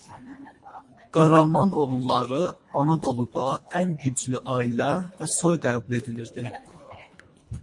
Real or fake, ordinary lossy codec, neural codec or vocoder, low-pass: fake; MP3, 48 kbps; codec, 24 kHz, 1.5 kbps, HILCodec; 10.8 kHz